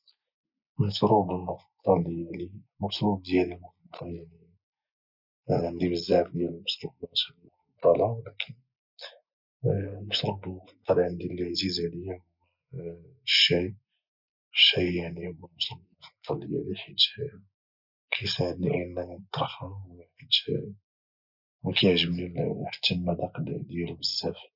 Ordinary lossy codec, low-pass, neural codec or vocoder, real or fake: none; 5.4 kHz; none; real